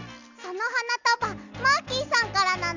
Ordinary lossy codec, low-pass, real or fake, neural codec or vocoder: none; 7.2 kHz; real; none